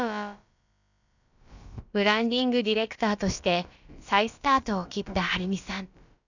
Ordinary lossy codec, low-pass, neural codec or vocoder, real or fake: none; 7.2 kHz; codec, 16 kHz, about 1 kbps, DyCAST, with the encoder's durations; fake